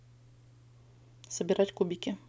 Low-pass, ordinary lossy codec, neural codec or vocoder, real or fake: none; none; none; real